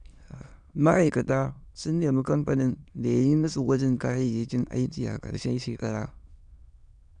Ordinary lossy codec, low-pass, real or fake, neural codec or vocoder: none; 9.9 kHz; fake; autoencoder, 22.05 kHz, a latent of 192 numbers a frame, VITS, trained on many speakers